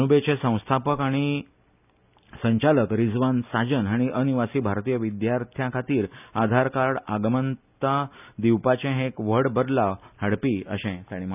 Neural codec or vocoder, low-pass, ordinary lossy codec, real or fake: none; 3.6 kHz; none; real